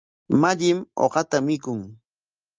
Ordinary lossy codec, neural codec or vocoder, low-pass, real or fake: Opus, 24 kbps; none; 7.2 kHz; real